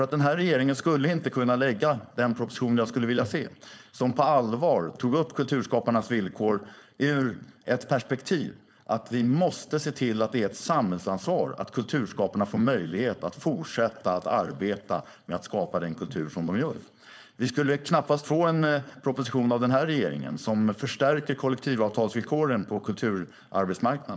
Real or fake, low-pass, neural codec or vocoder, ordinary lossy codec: fake; none; codec, 16 kHz, 4.8 kbps, FACodec; none